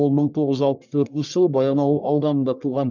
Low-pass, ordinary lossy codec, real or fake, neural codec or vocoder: 7.2 kHz; none; fake; codec, 44.1 kHz, 1.7 kbps, Pupu-Codec